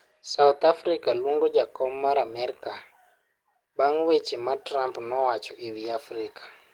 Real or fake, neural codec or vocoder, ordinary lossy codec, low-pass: fake; codec, 44.1 kHz, 7.8 kbps, DAC; Opus, 24 kbps; 19.8 kHz